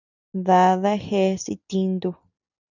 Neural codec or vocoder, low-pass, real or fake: none; 7.2 kHz; real